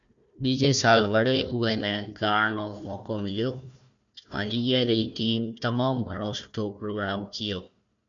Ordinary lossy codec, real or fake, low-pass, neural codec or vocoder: MP3, 64 kbps; fake; 7.2 kHz; codec, 16 kHz, 1 kbps, FunCodec, trained on Chinese and English, 50 frames a second